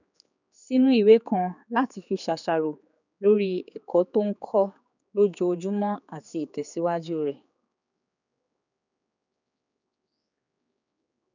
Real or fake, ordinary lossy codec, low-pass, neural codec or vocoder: fake; none; 7.2 kHz; codec, 16 kHz, 4 kbps, X-Codec, HuBERT features, trained on general audio